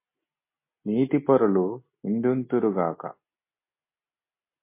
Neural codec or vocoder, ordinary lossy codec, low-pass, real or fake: none; MP3, 24 kbps; 3.6 kHz; real